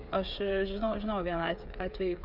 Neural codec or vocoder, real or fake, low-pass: codec, 16 kHz, 8 kbps, FreqCodec, smaller model; fake; 5.4 kHz